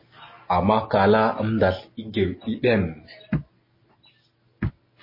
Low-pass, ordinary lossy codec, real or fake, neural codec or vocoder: 5.4 kHz; MP3, 24 kbps; real; none